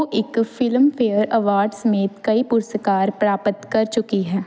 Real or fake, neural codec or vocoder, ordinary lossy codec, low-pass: real; none; none; none